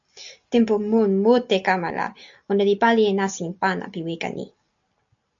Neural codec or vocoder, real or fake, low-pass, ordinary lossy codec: none; real; 7.2 kHz; AAC, 64 kbps